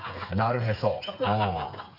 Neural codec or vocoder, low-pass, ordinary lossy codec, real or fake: codec, 16 kHz, 8 kbps, FreqCodec, smaller model; 5.4 kHz; none; fake